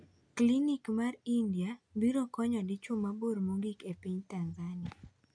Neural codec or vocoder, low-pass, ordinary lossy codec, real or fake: none; 9.9 kHz; none; real